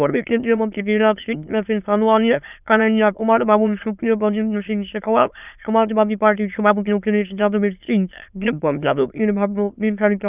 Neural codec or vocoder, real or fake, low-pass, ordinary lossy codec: autoencoder, 22.05 kHz, a latent of 192 numbers a frame, VITS, trained on many speakers; fake; 3.6 kHz; none